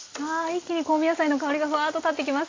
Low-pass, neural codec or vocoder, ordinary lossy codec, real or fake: 7.2 kHz; none; AAC, 32 kbps; real